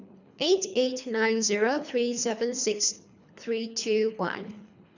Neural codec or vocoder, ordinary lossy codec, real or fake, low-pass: codec, 24 kHz, 3 kbps, HILCodec; none; fake; 7.2 kHz